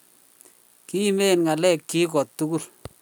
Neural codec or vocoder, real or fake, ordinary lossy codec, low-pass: none; real; none; none